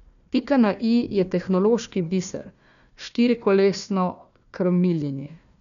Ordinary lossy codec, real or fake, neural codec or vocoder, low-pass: none; fake; codec, 16 kHz, 1 kbps, FunCodec, trained on Chinese and English, 50 frames a second; 7.2 kHz